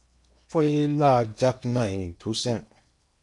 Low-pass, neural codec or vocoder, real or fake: 10.8 kHz; codec, 16 kHz in and 24 kHz out, 0.8 kbps, FocalCodec, streaming, 65536 codes; fake